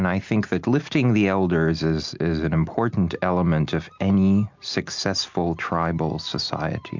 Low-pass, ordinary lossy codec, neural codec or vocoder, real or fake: 7.2 kHz; MP3, 64 kbps; none; real